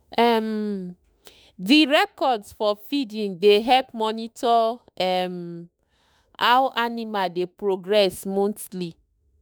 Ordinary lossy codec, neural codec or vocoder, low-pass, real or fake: none; autoencoder, 48 kHz, 32 numbers a frame, DAC-VAE, trained on Japanese speech; none; fake